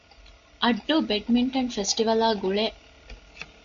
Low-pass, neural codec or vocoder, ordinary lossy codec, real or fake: 7.2 kHz; none; MP3, 48 kbps; real